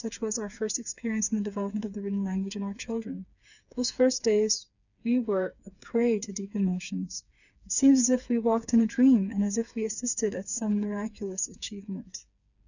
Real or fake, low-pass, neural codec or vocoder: fake; 7.2 kHz; codec, 16 kHz, 4 kbps, FreqCodec, smaller model